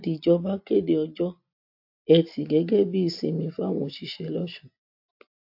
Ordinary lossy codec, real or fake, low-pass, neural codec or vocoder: AAC, 48 kbps; real; 5.4 kHz; none